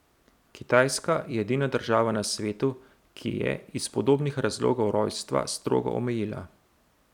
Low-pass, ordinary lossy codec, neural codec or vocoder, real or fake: 19.8 kHz; none; vocoder, 44.1 kHz, 128 mel bands every 256 samples, BigVGAN v2; fake